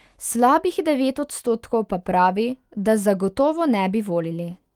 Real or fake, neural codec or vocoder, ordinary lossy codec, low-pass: fake; autoencoder, 48 kHz, 128 numbers a frame, DAC-VAE, trained on Japanese speech; Opus, 24 kbps; 19.8 kHz